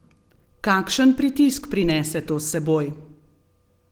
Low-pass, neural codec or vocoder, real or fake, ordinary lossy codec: 19.8 kHz; none; real; Opus, 16 kbps